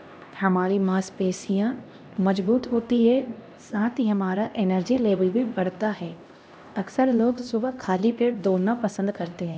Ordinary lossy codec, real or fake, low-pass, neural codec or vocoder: none; fake; none; codec, 16 kHz, 1 kbps, X-Codec, HuBERT features, trained on LibriSpeech